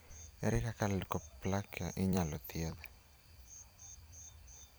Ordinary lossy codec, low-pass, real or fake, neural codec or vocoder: none; none; fake; vocoder, 44.1 kHz, 128 mel bands every 256 samples, BigVGAN v2